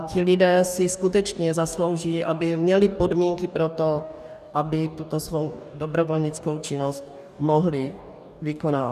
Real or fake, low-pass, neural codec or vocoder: fake; 14.4 kHz; codec, 44.1 kHz, 2.6 kbps, DAC